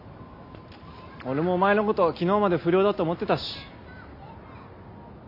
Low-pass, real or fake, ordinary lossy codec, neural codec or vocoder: 5.4 kHz; real; none; none